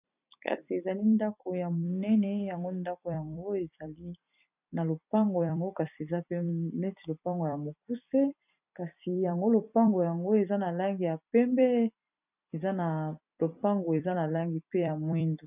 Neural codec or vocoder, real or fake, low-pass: vocoder, 44.1 kHz, 128 mel bands every 256 samples, BigVGAN v2; fake; 3.6 kHz